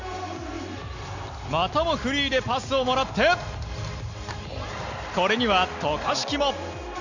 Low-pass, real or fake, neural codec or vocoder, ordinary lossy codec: 7.2 kHz; real; none; none